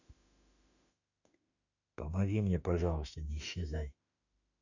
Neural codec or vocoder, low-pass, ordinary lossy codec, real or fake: autoencoder, 48 kHz, 32 numbers a frame, DAC-VAE, trained on Japanese speech; 7.2 kHz; none; fake